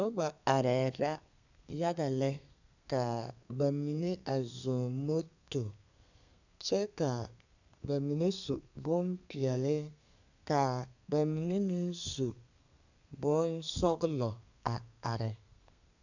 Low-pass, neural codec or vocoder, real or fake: 7.2 kHz; codec, 32 kHz, 1.9 kbps, SNAC; fake